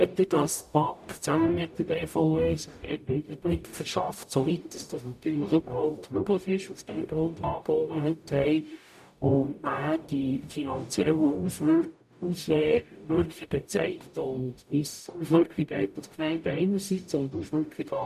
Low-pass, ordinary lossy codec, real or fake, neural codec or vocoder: 14.4 kHz; none; fake; codec, 44.1 kHz, 0.9 kbps, DAC